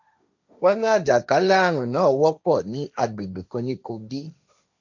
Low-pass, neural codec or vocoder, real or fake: 7.2 kHz; codec, 16 kHz, 1.1 kbps, Voila-Tokenizer; fake